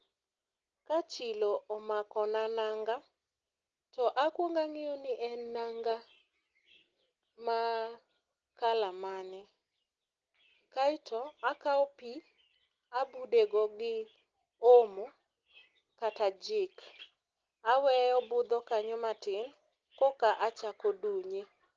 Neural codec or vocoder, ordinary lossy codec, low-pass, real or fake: none; Opus, 16 kbps; 7.2 kHz; real